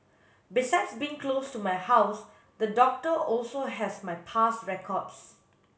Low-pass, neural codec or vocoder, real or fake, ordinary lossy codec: none; none; real; none